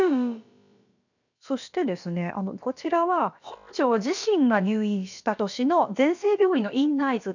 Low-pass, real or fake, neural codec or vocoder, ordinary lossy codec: 7.2 kHz; fake; codec, 16 kHz, about 1 kbps, DyCAST, with the encoder's durations; none